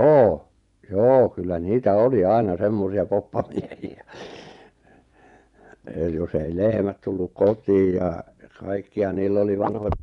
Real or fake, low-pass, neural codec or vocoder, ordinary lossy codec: fake; 10.8 kHz; vocoder, 24 kHz, 100 mel bands, Vocos; none